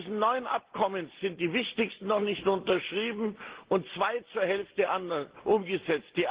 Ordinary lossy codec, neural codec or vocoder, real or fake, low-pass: Opus, 16 kbps; none; real; 3.6 kHz